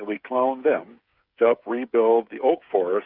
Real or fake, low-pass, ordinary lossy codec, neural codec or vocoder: fake; 5.4 kHz; Opus, 64 kbps; codec, 16 kHz, 8 kbps, FreqCodec, smaller model